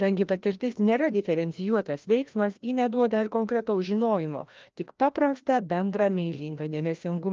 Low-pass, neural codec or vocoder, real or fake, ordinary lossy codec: 7.2 kHz; codec, 16 kHz, 1 kbps, FreqCodec, larger model; fake; Opus, 24 kbps